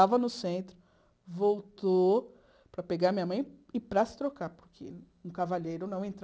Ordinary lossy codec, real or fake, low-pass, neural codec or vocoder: none; real; none; none